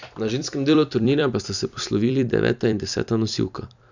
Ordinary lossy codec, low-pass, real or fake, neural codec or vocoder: none; 7.2 kHz; fake; vocoder, 44.1 kHz, 128 mel bands every 256 samples, BigVGAN v2